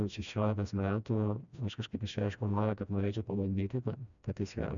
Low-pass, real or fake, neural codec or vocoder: 7.2 kHz; fake; codec, 16 kHz, 1 kbps, FreqCodec, smaller model